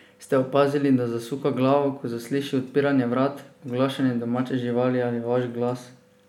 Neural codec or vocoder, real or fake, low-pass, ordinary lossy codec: none; real; 19.8 kHz; none